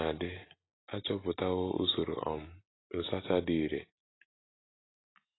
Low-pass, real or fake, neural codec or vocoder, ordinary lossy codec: 7.2 kHz; real; none; AAC, 16 kbps